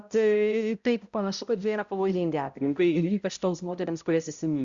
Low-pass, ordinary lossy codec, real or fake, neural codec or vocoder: 7.2 kHz; Opus, 64 kbps; fake; codec, 16 kHz, 0.5 kbps, X-Codec, HuBERT features, trained on balanced general audio